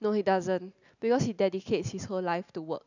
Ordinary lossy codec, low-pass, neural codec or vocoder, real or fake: none; 7.2 kHz; none; real